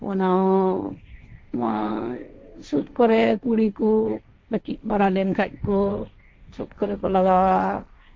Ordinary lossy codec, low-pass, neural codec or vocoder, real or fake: none; none; codec, 16 kHz, 1.1 kbps, Voila-Tokenizer; fake